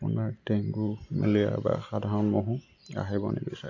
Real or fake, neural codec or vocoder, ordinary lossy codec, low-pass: real; none; none; 7.2 kHz